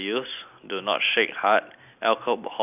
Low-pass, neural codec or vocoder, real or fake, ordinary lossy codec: 3.6 kHz; none; real; none